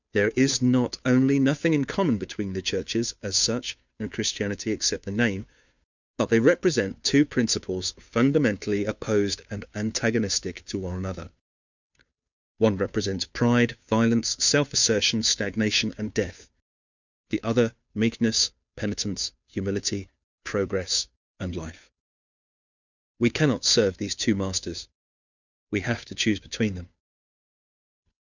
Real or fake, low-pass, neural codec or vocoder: fake; 7.2 kHz; codec, 16 kHz, 2 kbps, FunCodec, trained on Chinese and English, 25 frames a second